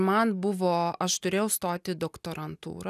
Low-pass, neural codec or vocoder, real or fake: 14.4 kHz; none; real